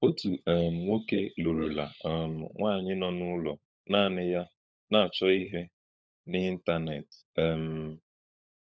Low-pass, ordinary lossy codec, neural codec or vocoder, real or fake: none; none; codec, 16 kHz, 16 kbps, FunCodec, trained on LibriTTS, 50 frames a second; fake